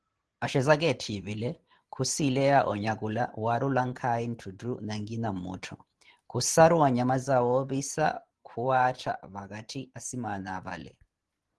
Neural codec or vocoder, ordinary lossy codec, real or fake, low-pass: vocoder, 44.1 kHz, 128 mel bands every 512 samples, BigVGAN v2; Opus, 16 kbps; fake; 10.8 kHz